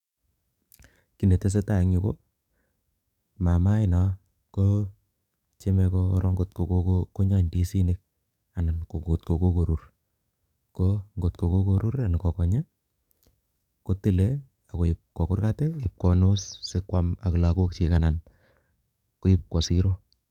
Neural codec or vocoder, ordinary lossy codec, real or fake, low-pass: codec, 44.1 kHz, 7.8 kbps, DAC; none; fake; 19.8 kHz